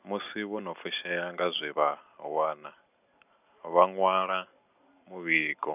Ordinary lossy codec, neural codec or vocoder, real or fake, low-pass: none; none; real; 3.6 kHz